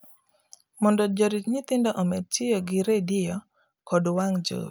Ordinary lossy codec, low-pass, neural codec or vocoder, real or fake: none; none; none; real